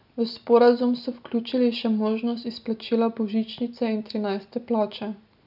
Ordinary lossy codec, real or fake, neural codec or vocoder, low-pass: none; real; none; 5.4 kHz